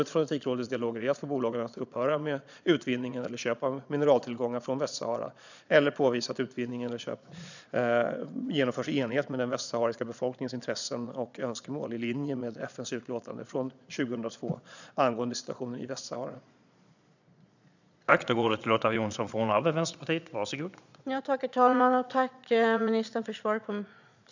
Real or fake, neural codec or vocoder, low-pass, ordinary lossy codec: fake; vocoder, 22.05 kHz, 80 mel bands, Vocos; 7.2 kHz; none